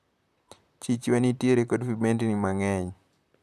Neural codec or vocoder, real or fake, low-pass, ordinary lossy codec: vocoder, 44.1 kHz, 128 mel bands, Pupu-Vocoder; fake; 14.4 kHz; none